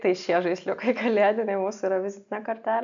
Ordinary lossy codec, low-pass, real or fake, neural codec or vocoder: AAC, 64 kbps; 7.2 kHz; real; none